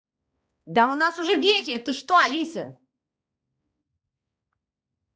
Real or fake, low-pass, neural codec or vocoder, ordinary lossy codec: fake; none; codec, 16 kHz, 1 kbps, X-Codec, HuBERT features, trained on balanced general audio; none